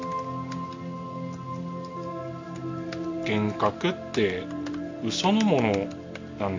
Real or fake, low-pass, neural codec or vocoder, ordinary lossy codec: real; 7.2 kHz; none; MP3, 64 kbps